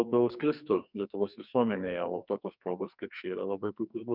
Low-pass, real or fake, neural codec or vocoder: 5.4 kHz; fake; codec, 44.1 kHz, 2.6 kbps, SNAC